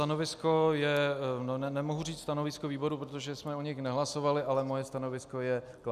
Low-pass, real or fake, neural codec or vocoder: 14.4 kHz; real; none